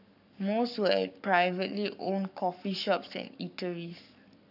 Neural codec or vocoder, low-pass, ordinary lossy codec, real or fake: codec, 44.1 kHz, 7.8 kbps, Pupu-Codec; 5.4 kHz; none; fake